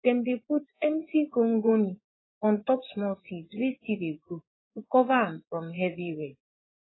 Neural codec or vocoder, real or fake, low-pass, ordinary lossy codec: vocoder, 24 kHz, 100 mel bands, Vocos; fake; 7.2 kHz; AAC, 16 kbps